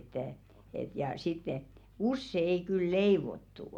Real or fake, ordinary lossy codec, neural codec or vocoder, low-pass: real; none; none; 19.8 kHz